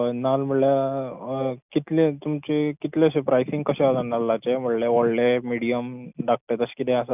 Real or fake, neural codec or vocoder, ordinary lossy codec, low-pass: real; none; none; 3.6 kHz